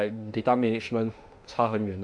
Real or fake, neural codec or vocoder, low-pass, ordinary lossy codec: fake; autoencoder, 48 kHz, 32 numbers a frame, DAC-VAE, trained on Japanese speech; 9.9 kHz; AAC, 64 kbps